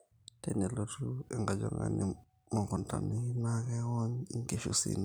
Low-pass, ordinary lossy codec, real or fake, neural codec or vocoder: none; none; real; none